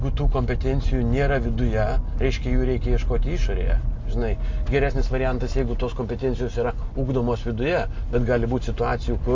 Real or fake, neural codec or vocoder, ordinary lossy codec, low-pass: real; none; MP3, 48 kbps; 7.2 kHz